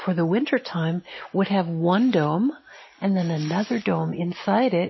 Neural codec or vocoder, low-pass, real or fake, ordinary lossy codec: none; 7.2 kHz; real; MP3, 24 kbps